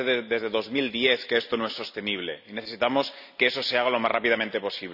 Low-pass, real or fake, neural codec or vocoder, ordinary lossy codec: 5.4 kHz; real; none; none